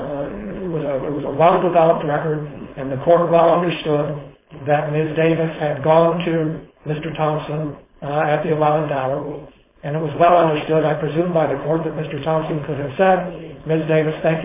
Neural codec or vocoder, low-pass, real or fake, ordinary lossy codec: codec, 16 kHz, 4.8 kbps, FACodec; 3.6 kHz; fake; MP3, 16 kbps